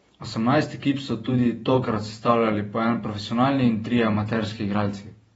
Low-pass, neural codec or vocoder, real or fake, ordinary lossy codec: 19.8 kHz; vocoder, 48 kHz, 128 mel bands, Vocos; fake; AAC, 24 kbps